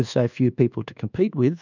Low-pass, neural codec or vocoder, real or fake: 7.2 kHz; codec, 24 kHz, 1.2 kbps, DualCodec; fake